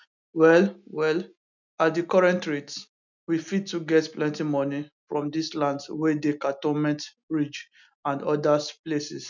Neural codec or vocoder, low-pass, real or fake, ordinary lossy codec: none; 7.2 kHz; real; none